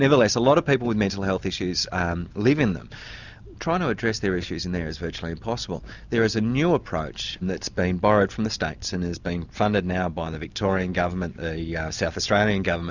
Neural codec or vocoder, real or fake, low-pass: none; real; 7.2 kHz